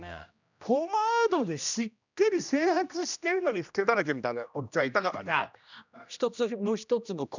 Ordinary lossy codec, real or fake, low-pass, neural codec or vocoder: none; fake; 7.2 kHz; codec, 16 kHz, 1 kbps, X-Codec, HuBERT features, trained on general audio